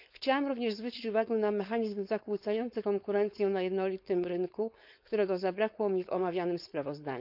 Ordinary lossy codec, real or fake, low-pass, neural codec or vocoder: none; fake; 5.4 kHz; codec, 16 kHz, 4.8 kbps, FACodec